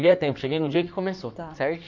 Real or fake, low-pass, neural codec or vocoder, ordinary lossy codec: fake; 7.2 kHz; codec, 16 kHz in and 24 kHz out, 2.2 kbps, FireRedTTS-2 codec; none